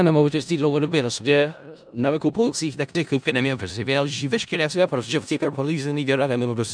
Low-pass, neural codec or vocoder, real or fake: 9.9 kHz; codec, 16 kHz in and 24 kHz out, 0.4 kbps, LongCat-Audio-Codec, four codebook decoder; fake